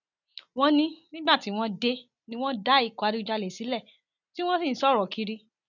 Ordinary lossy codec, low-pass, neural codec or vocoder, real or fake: none; 7.2 kHz; none; real